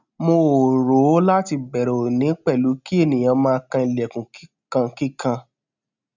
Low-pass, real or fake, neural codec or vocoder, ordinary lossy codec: 7.2 kHz; real; none; none